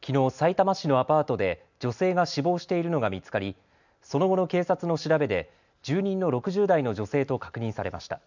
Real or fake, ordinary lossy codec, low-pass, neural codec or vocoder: real; none; 7.2 kHz; none